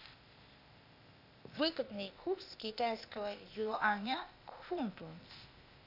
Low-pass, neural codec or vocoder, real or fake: 5.4 kHz; codec, 16 kHz, 0.8 kbps, ZipCodec; fake